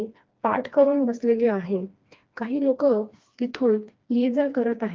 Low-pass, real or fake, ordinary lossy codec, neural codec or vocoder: 7.2 kHz; fake; Opus, 32 kbps; codec, 16 kHz, 2 kbps, FreqCodec, smaller model